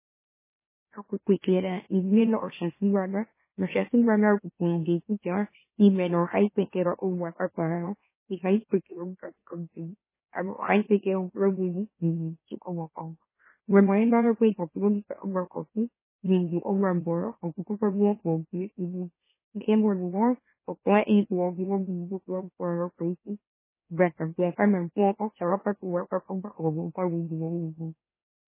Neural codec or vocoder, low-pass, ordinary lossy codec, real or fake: autoencoder, 44.1 kHz, a latent of 192 numbers a frame, MeloTTS; 3.6 kHz; MP3, 16 kbps; fake